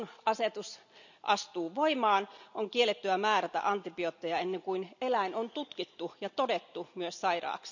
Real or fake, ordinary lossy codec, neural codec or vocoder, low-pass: real; none; none; 7.2 kHz